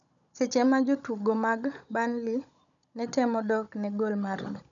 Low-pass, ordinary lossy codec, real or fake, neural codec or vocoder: 7.2 kHz; none; fake; codec, 16 kHz, 16 kbps, FunCodec, trained on Chinese and English, 50 frames a second